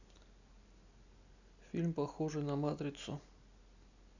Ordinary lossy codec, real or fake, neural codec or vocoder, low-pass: none; real; none; 7.2 kHz